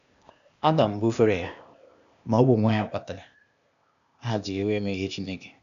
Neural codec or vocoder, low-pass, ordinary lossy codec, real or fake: codec, 16 kHz, 0.8 kbps, ZipCodec; 7.2 kHz; none; fake